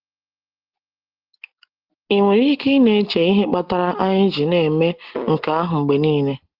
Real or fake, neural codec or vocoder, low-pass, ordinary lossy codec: real; none; 5.4 kHz; Opus, 16 kbps